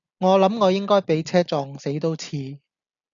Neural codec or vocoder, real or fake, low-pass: none; real; 7.2 kHz